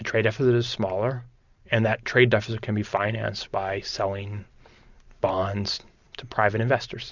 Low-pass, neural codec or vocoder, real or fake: 7.2 kHz; none; real